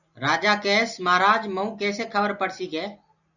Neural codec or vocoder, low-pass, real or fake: none; 7.2 kHz; real